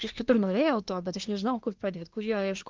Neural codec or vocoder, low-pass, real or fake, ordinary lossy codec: codec, 24 kHz, 1 kbps, SNAC; 7.2 kHz; fake; Opus, 32 kbps